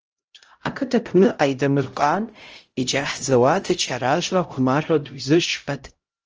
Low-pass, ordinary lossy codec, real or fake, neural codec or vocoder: 7.2 kHz; Opus, 24 kbps; fake; codec, 16 kHz, 0.5 kbps, X-Codec, HuBERT features, trained on LibriSpeech